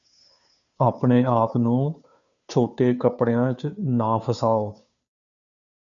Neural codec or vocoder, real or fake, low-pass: codec, 16 kHz, 2 kbps, FunCodec, trained on Chinese and English, 25 frames a second; fake; 7.2 kHz